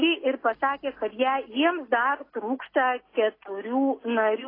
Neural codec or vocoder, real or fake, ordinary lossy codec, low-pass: none; real; AAC, 24 kbps; 5.4 kHz